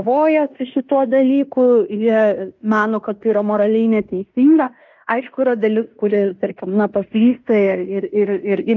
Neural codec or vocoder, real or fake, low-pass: codec, 16 kHz in and 24 kHz out, 0.9 kbps, LongCat-Audio-Codec, fine tuned four codebook decoder; fake; 7.2 kHz